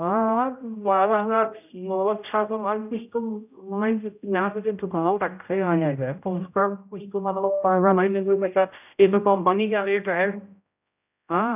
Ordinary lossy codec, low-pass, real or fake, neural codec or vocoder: none; 3.6 kHz; fake; codec, 16 kHz, 0.5 kbps, X-Codec, HuBERT features, trained on general audio